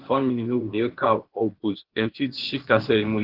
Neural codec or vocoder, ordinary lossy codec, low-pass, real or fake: codec, 16 kHz, 0.8 kbps, ZipCodec; Opus, 16 kbps; 5.4 kHz; fake